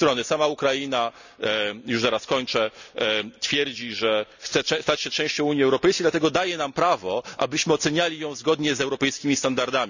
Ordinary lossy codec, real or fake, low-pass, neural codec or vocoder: none; real; 7.2 kHz; none